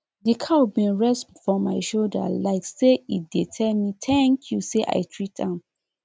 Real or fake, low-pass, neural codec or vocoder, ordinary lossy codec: real; none; none; none